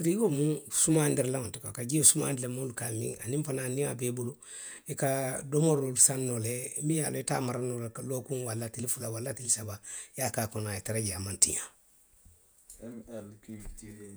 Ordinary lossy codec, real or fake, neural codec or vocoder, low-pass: none; fake; vocoder, 48 kHz, 128 mel bands, Vocos; none